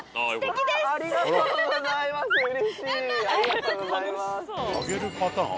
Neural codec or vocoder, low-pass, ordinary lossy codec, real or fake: none; none; none; real